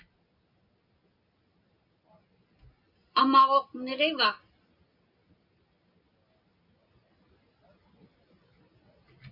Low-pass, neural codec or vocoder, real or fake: 5.4 kHz; none; real